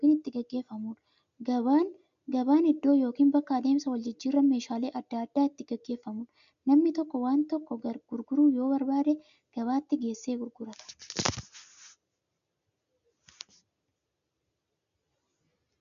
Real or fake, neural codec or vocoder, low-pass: real; none; 7.2 kHz